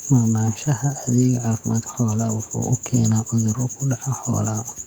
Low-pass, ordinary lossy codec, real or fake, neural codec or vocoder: 19.8 kHz; Opus, 64 kbps; fake; codec, 44.1 kHz, 7.8 kbps, DAC